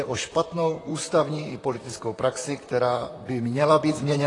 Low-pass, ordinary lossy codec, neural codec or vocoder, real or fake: 10.8 kHz; AAC, 32 kbps; vocoder, 44.1 kHz, 128 mel bands, Pupu-Vocoder; fake